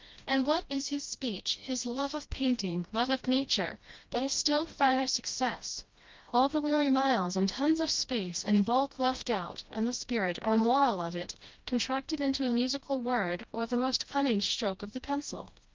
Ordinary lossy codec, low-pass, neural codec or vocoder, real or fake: Opus, 32 kbps; 7.2 kHz; codec, 16 kHz, 1 kbps, FreqCodec, smaller model; fake